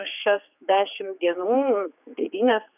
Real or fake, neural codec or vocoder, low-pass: fake; codec, 16 kHz, 4 kbps, X-Codec, HuBERT features, trained on general audio; 3.6 kHz